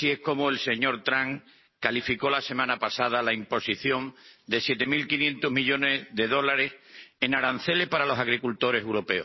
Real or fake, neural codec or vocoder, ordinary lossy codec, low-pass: real; none; MP3, 24 kbps; 7.2 kHz